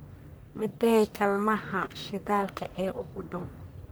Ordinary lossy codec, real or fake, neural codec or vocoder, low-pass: none; fake; codec, 44.1 kHz, 1.7 kbps, Pupu-Codec; none